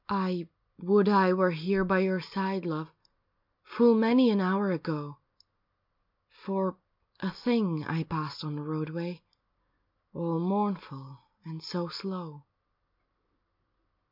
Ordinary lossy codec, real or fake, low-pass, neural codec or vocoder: MP3, 48 kbps; real; 5.4 kHz; none